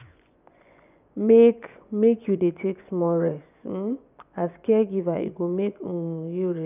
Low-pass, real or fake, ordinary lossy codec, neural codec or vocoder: 3.6 kHz; fake; none; vocoder, 24 kHz, 100 mel bands, Vocos